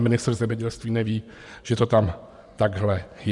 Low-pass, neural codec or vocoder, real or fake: 10.8 kHz; vocoder, 24 kHz, 100 mel bands, Vocos; fake